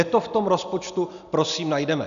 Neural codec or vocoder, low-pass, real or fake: none; 7.2 kHz; real